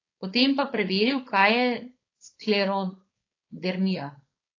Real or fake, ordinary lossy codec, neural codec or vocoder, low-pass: fake; AAC, 32 kbps; codec, 16 kHz, 4.8 kbps, FACodec; 7.2 kHz